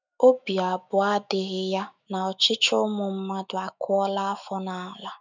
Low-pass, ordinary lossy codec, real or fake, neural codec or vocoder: 7.2 kHz; none; fake; autoencoder, 48 kHz, 128 numbers a frame, DAC-VAE, trained on Japanese speech